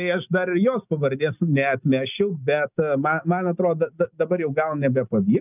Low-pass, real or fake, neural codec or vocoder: 3.6 kHz; real; none